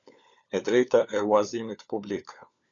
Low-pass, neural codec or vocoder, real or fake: 7.2 kHz; codec, 16 kHz, 4 kbps, FunCodec, trained on LibriTTS, 50 frames a second; fake